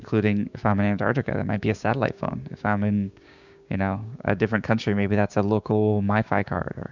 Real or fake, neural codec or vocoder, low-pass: fake; codec, 16 kHz, 6 kbps, DAC; 7.2 kHz